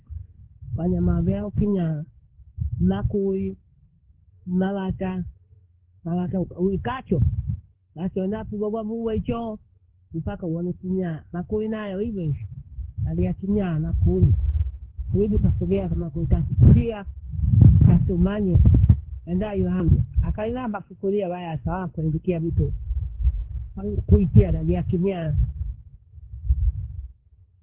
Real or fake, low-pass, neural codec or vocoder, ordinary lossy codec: fake; 3.6 kHz; codec, 16 kHz in and 24 kHz out, 1 kbps, XY-Tokenizer; Opus, 16 kbps